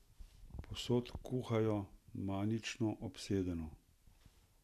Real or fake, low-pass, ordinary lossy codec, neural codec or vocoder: real; 14.4 kHz; none; none